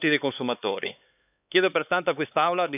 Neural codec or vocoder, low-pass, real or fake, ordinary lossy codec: codec, 16 kHz, 4 kbps, X-Codec, HuBERT features, trained on LibriSpeech; 3.6 kHz; fake; none